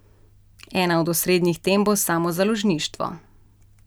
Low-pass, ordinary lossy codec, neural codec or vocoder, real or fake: none; none; none; real